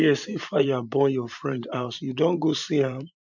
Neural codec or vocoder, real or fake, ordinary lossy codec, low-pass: vocoder, 44.1 kHz, 128 mel bands every 512 samples, BigVGAN v2; fake; none; 7.2 kHz